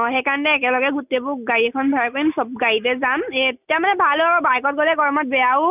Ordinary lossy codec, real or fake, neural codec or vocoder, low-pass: none; real; none; 3.6 kHz